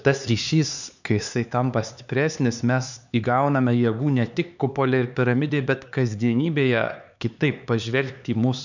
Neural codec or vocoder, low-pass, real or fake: codec, 16 kHz, 4 kbps, X-Codec, HuBERT features, trained on LibriSpeech; 7.2 kHz; fake